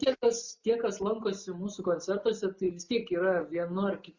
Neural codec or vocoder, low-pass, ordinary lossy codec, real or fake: none; 7.2 kHz; Opus, 64 kbps; real